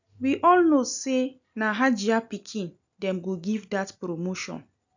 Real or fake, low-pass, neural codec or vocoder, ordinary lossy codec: real; 7.2 kHz; none; none